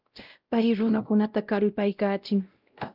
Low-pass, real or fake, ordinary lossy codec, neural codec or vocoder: 5.4 kHz; fake; Opus, 24 kbps; codec, 16 kHz, 0.5 kbps, X-Codec, WavLM features, trained on Multilingual LibriSpeech